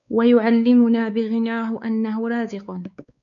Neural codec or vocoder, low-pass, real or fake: codec, 16 kHz, 4 kbps, X-Codec, WavLM features, trained on Multilingual LibriSpeech; 7.2 kHz; fake